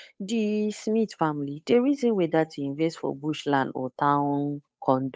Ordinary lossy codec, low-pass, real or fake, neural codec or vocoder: none; none; fake; codec, 16 kHz, 8 kbps, FunCodec, trained on Chinese and English, 25 frames a second